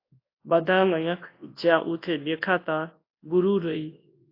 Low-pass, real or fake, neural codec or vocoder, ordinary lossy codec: 5.4 kHz; fake; codec, 24 kHz, 0.9 kbps, WavTokenizer, large speech release; AAC, 32 kbps